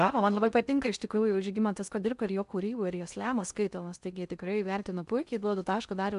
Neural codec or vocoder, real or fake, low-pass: codec, 16 kHz in and 24 kHz out, 0.6 kbps, FocalCodec, streaming, 2048 codes; fake; 10.8 kHz